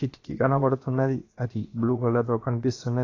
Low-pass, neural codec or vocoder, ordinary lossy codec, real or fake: 7.2 kHz; codec, 16 kHz, about 1 kbps, DyCAST, with the encoder's durations; AAC, 32 kbps; fake